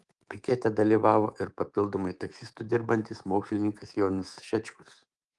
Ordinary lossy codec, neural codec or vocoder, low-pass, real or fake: Opus, 24 kbps; codec, 24 kHz, 3.1 kbps, DualCodec; 10.8 kHz; fake